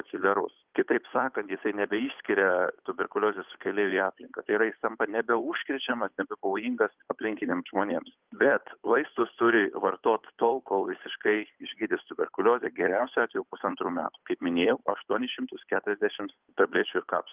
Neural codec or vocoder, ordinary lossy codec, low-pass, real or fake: codec, 16 kHz, 8 kbps, FunCodec, trained on Chinese and English, 25 frames a second; Opus, 24 kbps; 3.6 kHz; fake